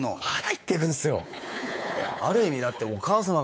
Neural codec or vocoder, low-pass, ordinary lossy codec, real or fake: codec, 16 kHz, 4 kbps, X-Codec, WavLM features, trained on Multilingual LibriSpeech; none; none; fake